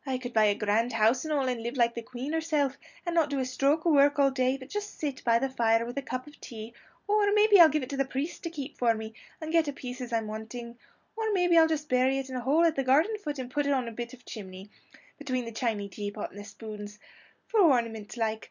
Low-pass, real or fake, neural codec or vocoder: 7.2 kHz; real; none